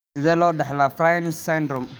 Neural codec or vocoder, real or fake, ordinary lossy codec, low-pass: codec, 44.1 kHz, 7.8 kbps, DAC; fake; none; none